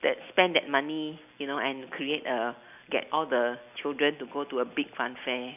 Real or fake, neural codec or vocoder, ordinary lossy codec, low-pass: real; none; none; 3.6 kHz